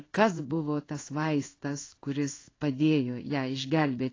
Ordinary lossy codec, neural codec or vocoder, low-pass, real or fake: AAC, 32 kbps; codec, 16 kHz in and 24 kHz out, 1 kbps, XY-Tokenizer; 7.2 kHz; fake